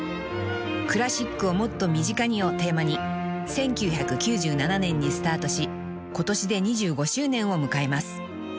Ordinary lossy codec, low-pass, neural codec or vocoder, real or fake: none; none; none; real